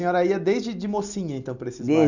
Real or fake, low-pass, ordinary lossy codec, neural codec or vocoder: real; 7.2 kHz; none; none